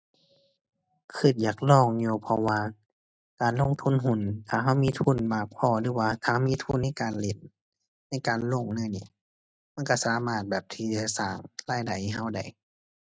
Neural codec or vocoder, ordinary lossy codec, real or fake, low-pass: none; none; real; none